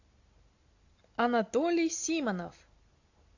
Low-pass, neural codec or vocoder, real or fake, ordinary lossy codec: 7.2 kHz; none; real; AAC, 48 kbps